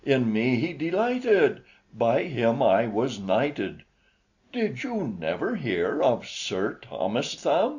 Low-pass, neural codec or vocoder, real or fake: 7.2 kHz; none; real